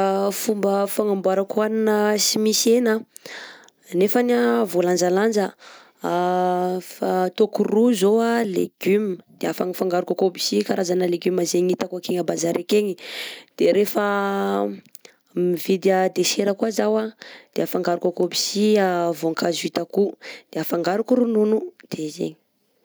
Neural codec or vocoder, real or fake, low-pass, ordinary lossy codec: none; real; none; none